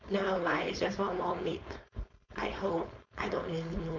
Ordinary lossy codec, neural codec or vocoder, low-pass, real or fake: MP3, 64 kbps; codec, 16 kHz, 4.8 kbps, FACodec; 7.2 kHz; fake